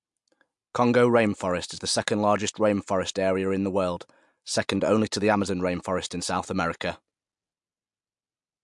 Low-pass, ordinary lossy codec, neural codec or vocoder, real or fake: 10.8 kHz; MP3, 64 kbps; none; real